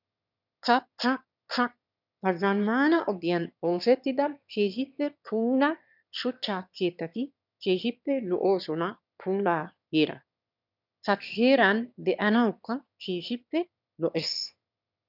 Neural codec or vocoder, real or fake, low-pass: autoencoder, 22.05 kHz, a latent of 192 numbers a frame, VITS, trained on one speaker; fake; 5.4 kHz